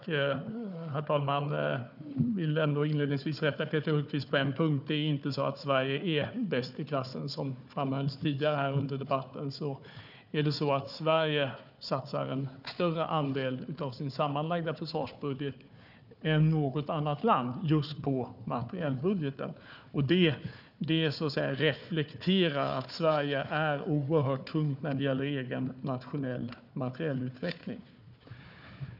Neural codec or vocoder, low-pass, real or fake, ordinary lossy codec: codec, 16 kHz, 4 kbps, FunCodec, trained on Chinese and English, 50 frames a second; 5.4 kHz; fake; none